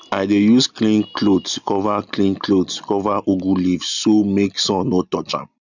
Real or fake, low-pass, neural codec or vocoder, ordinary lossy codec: real; 7.2 kHz; none; none